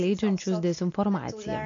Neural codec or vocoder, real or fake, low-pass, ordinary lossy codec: none; real; 7.2 kHz; MP3, 48 kbps